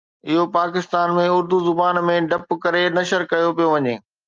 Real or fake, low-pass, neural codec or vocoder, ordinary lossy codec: real; 7.2 kHz; none; Opus, 24 kbps